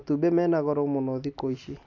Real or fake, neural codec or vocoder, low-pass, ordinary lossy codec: real; none; 7.2 kHz; none